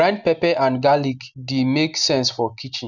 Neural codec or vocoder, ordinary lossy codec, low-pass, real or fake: none; none; 7.2 kHz; real